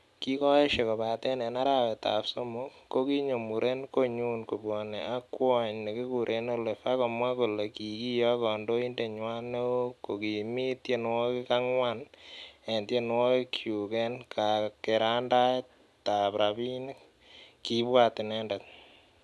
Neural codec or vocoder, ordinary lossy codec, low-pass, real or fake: none; none; none; real